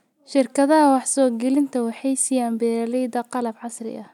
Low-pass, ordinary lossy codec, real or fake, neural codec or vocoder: 19.8 kHz; none; real; none